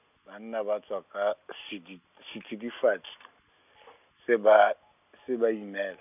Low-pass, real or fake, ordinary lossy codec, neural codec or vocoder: 3.6 kHz; real; none; none